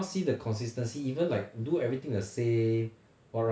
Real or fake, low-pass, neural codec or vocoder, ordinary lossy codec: real; none; none; none